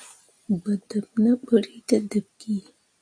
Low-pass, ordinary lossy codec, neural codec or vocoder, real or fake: 9.9 kHz; AAC, 48 kbps; none; real